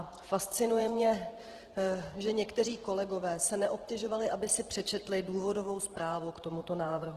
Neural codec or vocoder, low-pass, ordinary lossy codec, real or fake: vocoder, 48 kHz, 128 mel bands, Vocos; 14.4 kHz; Opus, 16 kbps; fake